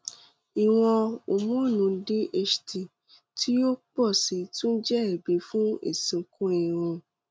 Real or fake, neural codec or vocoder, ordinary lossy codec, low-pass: real; none; none; none